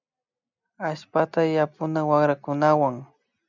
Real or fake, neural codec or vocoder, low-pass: real; none; 7.2 kHz